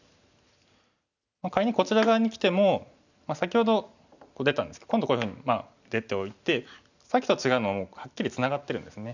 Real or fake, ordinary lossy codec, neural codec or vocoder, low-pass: real; none; none; 7.2 kHz